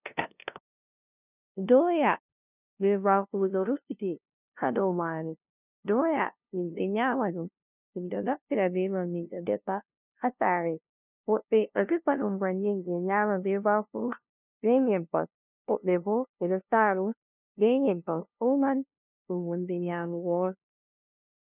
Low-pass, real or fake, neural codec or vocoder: 3.6 kHz; fake; codec, 16 kHz, 0.5 kbps, FunCodec, trained on LibriTTS, 25 frames a second